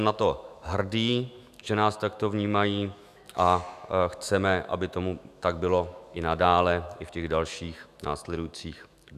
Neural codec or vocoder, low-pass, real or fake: autoencoder, 48 kHz, 128 numbers a frame, DAC-VAE, trained on Japanese speech; 14.4 kHz; fake